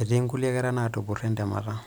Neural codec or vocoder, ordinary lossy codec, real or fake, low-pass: none; none; real; none